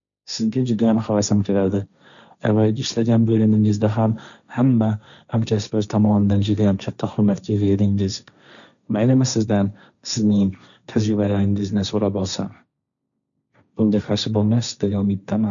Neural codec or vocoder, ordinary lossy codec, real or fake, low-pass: codec, 16 kHz, 1.1 kbps, Voila-Tokenizer; none; fake; 7.2 kHz